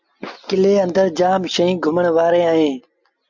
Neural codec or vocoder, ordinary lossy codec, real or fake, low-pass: none; Opus, 64 kbps; real; 7.2 kHz